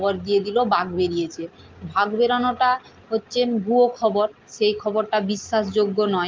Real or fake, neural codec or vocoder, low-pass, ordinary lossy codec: real; none; 7.2 kHz; Opus, 32 kbps